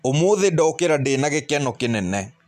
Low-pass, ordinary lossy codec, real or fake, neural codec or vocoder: 14.4 kHz; MP3, 96 kbps; real; none